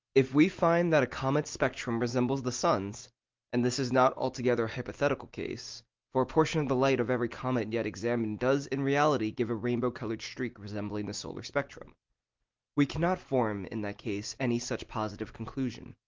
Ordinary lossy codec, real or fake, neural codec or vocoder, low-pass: Opus, 32 kbps; real; none; 7.2 kHz